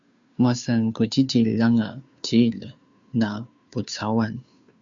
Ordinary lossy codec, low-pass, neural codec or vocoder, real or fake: MP3, 64 kbps; 7.2 kHz; codec, 16 kHz, 2 kbps, FunCodec, trained on Chinese and English, 25 frames a second; fake